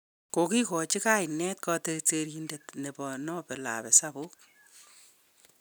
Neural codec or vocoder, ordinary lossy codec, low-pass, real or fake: none; none; none; real